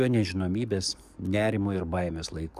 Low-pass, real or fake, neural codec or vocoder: 14.4 kHz; fake; codec, 44.1 kHz, 7.8 kbps, DAC